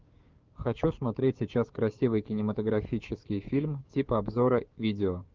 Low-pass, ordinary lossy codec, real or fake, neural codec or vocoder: 7.2 kHz; Opus, 32 kbps; fake; codec, 16 kHz, 6 kbps, DAC